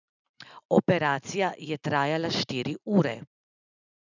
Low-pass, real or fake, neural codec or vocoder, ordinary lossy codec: 7.2 kHz; real; none; none